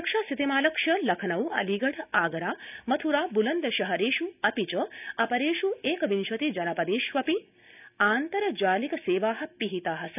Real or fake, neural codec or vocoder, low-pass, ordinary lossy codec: real; none; 3.6 kHz; none